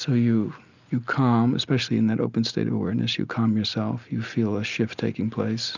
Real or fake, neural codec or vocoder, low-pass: real; none; 7.2 kHz